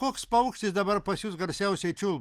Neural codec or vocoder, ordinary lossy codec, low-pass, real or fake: none; Opus, 32 kbps; 14.4 kHz; real